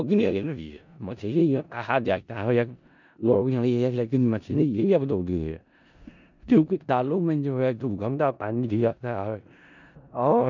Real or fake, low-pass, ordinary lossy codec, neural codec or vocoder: fake; 7.2 kHz; none; codec, 16 kHz in and 24 kHz out, 0.4 kbps, LongCat-Audio-Codec, four codebook decoder